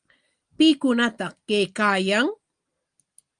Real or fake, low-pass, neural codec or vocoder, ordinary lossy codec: real; 9.9 kHz; none; Opus, 32 kbps